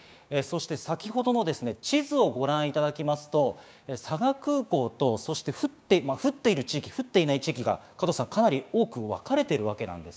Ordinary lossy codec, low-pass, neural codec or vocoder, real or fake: none; none; codec, 16 kHz, 6 kbps, DAC; fake